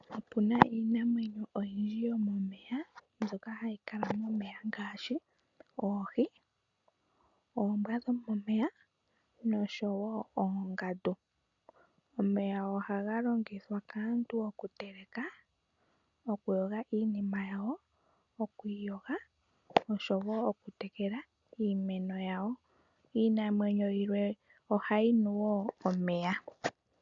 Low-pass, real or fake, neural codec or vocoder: 7.2 kHz; real; none